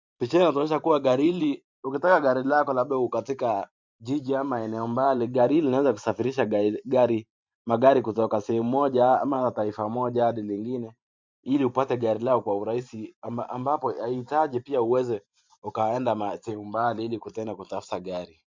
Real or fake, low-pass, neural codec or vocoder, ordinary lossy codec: fake; 7.2 kHz; vocoder, 44.1 kHz, 128 mel bands every 512 samples, BigVGAN v2; MP3, 64 kbps